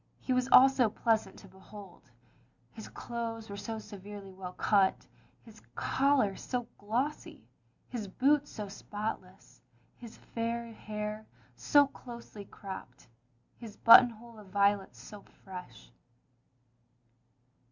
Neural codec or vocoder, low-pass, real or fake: none; 7.2 kHz; real